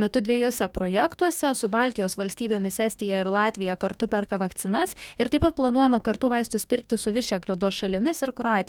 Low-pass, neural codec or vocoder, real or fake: 19.8 kHz; codec, 44.1 kHz, 2.6 kbps, DAC; fake